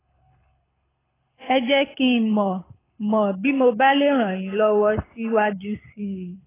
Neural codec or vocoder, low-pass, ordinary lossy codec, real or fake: codec, 24 kHz, 6 kbps, HILCodec; 3.6 kHz; AAC, 16 kbps; fake